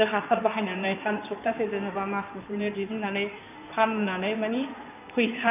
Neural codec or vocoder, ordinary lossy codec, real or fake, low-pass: codec, 16 kHz in and 24 kHz out, 2.2 kbps, FireRedTTS-2 codec; none; fake; 3.6 kHz